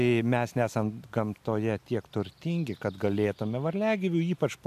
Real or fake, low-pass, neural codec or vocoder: real; 14.4 kHz; none